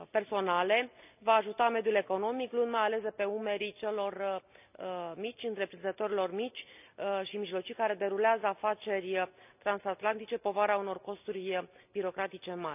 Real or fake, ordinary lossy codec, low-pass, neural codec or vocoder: real; none; 3.6 kHz; none